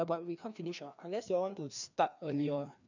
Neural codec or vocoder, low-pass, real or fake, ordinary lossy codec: codec, 16 kHz, 2 kbps, FreqCodec, larger model; 7.2 kHz; fake; none